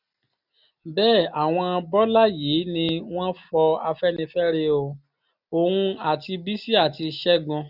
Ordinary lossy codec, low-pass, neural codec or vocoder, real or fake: none; 5.4 kHz; none; real